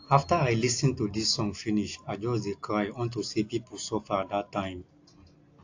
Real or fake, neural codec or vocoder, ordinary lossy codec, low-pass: real; none; AAC, 48 kbps; 7.2 kHz